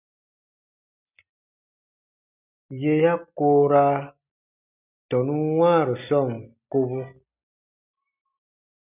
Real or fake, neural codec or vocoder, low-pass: real; none; 3.6 kHz